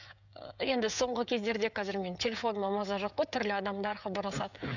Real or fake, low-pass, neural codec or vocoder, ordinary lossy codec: fake; 7.2 kHz; codec, 16 kHz, 16 kbps, FreqCodec, smaller model; none